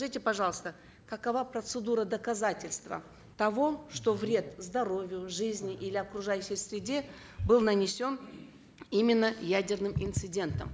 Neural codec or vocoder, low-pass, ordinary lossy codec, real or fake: none; none; none; real